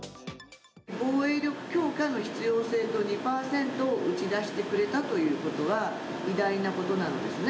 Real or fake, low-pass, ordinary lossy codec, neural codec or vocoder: real; none; none; none